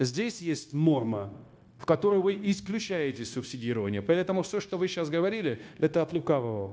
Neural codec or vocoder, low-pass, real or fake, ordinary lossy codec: codec, 16 kHz, 0.9 kbps, LongCat-Audio-Codec; none; fake; none